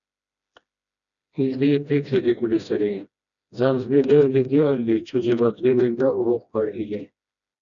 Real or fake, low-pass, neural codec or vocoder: fake; 7.2 kHz; codec, 16 kHz, 1 kbps, FreqCodec, smaller model